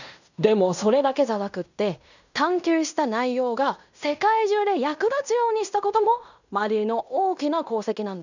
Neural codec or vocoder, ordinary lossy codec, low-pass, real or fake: codec, 16 kHz in and 24 kHz out, 0.9 kbps, LongCat-Audio-Codec, fine tuned four codebook decoder; none; 7.2 kHz; fake